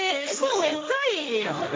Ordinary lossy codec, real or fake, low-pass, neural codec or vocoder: none; fake; none; codec, 16 kHz, 1.1 kbps, Voila-Tokenizer